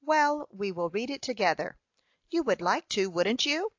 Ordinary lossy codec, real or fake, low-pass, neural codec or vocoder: AAC, 48 kbps; real; 7.2 kHz; none